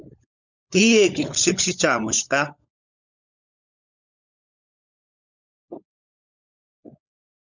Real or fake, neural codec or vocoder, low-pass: fake; codec, 16 kHz, 16 kbps, FunCodec, trained on LibriTTS, 50 frames a second; 7.2 kHz